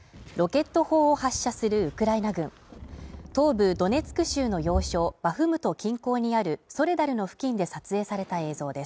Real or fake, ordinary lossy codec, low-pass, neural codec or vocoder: real; none; none; none